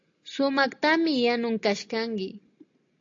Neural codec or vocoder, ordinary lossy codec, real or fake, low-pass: none; AAC, 48 kbps; real; 7.2 kHz